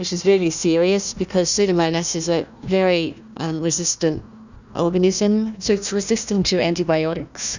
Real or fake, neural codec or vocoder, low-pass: fake; codec, 16 kHz, 1 kbps, FunCodec, trained on Chinese and English, 50 frames a second; 7.2 kHz